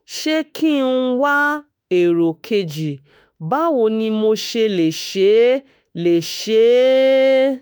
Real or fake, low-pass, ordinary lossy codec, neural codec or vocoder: fake; none; none; autoencoder, 48 kHz, 32 numbers a frame, DAC-VAE, trained on Japanese speech